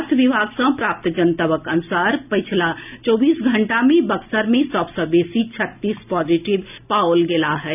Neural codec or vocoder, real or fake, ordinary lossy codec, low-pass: none; real; none; 3.6 kHz